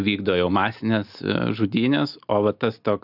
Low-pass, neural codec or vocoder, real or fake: 5.4 kHz; none; real